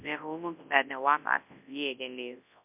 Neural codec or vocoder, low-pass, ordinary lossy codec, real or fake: codec, 24 kHz, 0.9 kbps, WavTokenizer, large speech release; 3.6 kHz; MP3, 32 kbps; fake